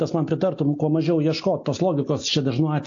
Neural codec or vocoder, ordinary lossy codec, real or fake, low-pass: none; AAC, 48 kbps; real; 7.2 kHz